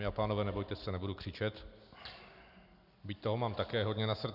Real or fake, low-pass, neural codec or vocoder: real; 5.4 kHz; none